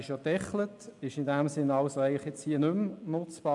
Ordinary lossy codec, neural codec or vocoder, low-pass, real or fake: none; none; 10.8 kHz; real